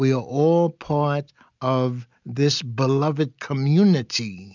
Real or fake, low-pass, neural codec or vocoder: real; 7.2 kHz; none